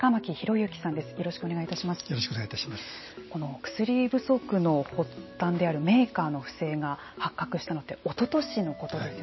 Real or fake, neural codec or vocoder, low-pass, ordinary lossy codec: real; none; 7.2 kHz; MP3, 24 kbps